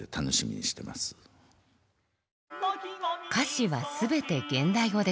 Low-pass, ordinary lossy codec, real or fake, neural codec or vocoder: none; none; real; none